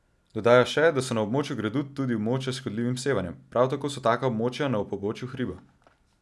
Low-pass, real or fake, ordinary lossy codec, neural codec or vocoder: none; real; none; none